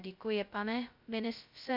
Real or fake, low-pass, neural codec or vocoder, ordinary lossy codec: fake; 5.4 kHz; codec, 16 kHz, 0.2 kbps, FocalCodec; MP3, 48 kbps